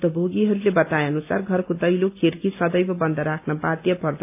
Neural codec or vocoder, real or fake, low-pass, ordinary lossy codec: none; real; 3.6 kHz; AAC, 32 kbps